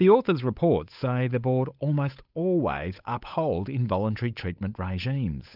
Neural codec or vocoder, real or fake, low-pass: codec, 16 kHz, 8 kbps, FunCodec, trained on Chinese and English, 25 frames a second; fake; 5.4 kHz